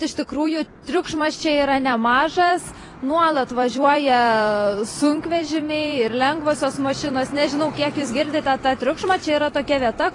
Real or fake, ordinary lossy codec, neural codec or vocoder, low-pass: fake; AAC, 32 kbps; vocoder, 44.1 kHz, 128 mel bands every 256 samples, BigVGAN v2; 10.8 kHz